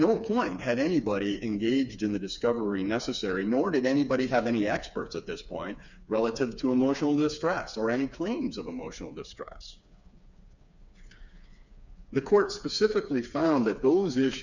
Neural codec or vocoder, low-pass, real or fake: codec, 16 kHz, 4 kbps, FreqCodec, smaller model; 7.2 kHz; fake